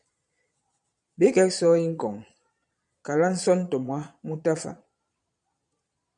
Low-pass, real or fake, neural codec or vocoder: 9.9 kHz; fake; vocoder, 22.05 kHz, 80 mel bands, Vocos